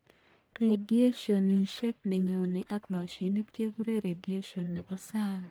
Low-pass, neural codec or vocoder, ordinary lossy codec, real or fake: none; codec, 44.1 kHz, 1.7 kbps, Pupu-Codec; none; fake